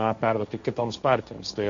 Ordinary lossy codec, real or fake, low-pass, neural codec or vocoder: MP3, 48 kbps; fake; 7.2 kHz; codec, 16 kHz, 1.1 kbps, Voila-Tokenizer